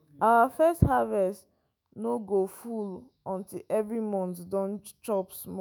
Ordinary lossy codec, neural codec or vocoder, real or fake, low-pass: none; autoencoder, 48 kHz, 128 numbers a frame, DAC-VAE, trained on Japanese speech; fake; none